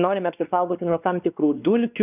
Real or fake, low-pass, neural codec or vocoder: fake; 3.6 kHz; codec, 16 kHz, 2 kbps, X-Codec, WavLM features, trained on Multilingual LibriSpeech